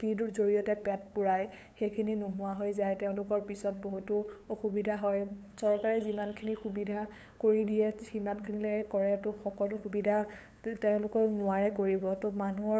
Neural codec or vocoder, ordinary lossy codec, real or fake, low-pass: codec, 16 kHz, 8 kbps, FunCodec, trained on LibriTTS, 25 frames a second; none; fake; none